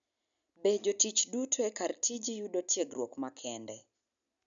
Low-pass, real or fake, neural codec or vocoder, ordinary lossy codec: 7.2 kHz; real; none; none